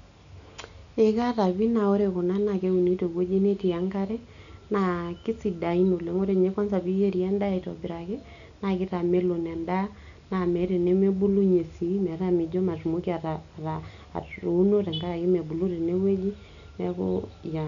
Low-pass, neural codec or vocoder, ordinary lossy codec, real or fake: 7.2 kHz; none; none; real